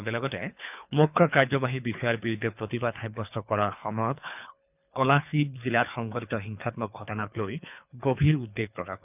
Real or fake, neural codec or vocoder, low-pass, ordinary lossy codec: fake; codec, 24 kHz, 3 kbps, HILCodec; 3.6 kHz; none